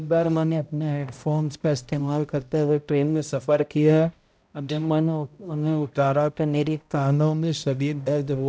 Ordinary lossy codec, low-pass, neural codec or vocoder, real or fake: none; none; codec, 16 kHz, 0.5 kbps, X-Codec, HuBERT features, trained on balanced general audio; fake